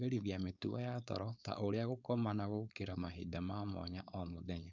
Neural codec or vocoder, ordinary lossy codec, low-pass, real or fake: codec, 16 kHz, 4.8 kbps, FACodec; none; 7.2 kHz; fake